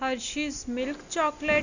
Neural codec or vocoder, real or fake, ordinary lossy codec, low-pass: none; real; none; 7.2 kHz